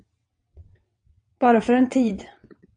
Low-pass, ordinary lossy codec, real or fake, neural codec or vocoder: 9.9 kHz; AAC, 64 kbps; fake; vocoder, 22.05 kHz, 80 mel bands, WaveNeXt